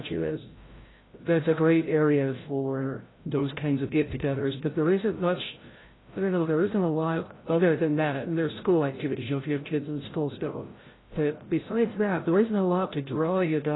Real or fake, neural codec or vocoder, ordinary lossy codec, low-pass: fake; codec, 16 kHz, 0.5 kbps, FreqCodec, larger model; AAC, 16 kbps; 7.2 kHz